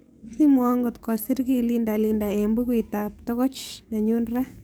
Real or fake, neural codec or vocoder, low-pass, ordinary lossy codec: fake; codec, 44.1 kHz, 7.8 kbps, DAC; none; none